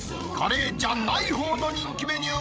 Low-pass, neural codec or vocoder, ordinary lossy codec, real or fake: none; codec, 16 kHz, 16 kbps, FreqCodec, larger model; none; fake